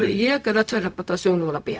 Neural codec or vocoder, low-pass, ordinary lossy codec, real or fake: codec, 16 kHz, 0.4 kbps, LongCat-Audio-Codec; none; none; fake